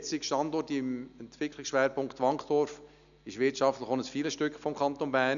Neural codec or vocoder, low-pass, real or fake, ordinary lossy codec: none; 7.2 kHz; real; none